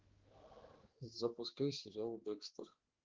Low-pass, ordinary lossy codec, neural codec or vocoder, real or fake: 7.2 kHz; Opus, 16 kbps; codec, 16 kHz, 2 kbps, X-Codec, HuBERT features, trained on balanced general audio; fake